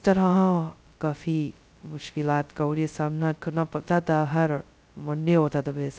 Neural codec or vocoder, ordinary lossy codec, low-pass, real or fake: codec, 16 kHz, 0.2 kbps, FocalCodec; none; none; fake